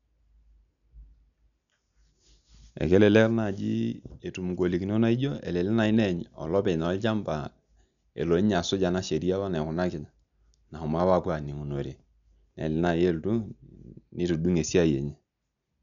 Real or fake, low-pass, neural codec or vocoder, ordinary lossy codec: real; 7.2 kHz; none; MP3, 96 kbps